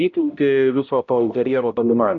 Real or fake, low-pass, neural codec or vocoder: fake; 7.2 kHz; codec, 16 kHz, 0.5 kbps, X-Codec, HuBERT features, trained on balanced general audio